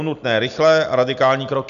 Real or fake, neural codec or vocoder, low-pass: real; none; 7.2 kHz